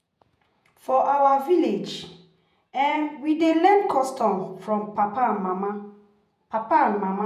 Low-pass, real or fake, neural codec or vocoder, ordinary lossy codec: 14.4 kHz; fake; vocoder, 48 kHz, 128 mel bands, Vocos; none